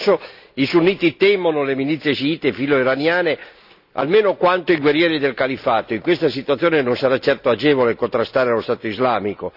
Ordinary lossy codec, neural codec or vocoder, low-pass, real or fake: none; none; 5.4 kHz; real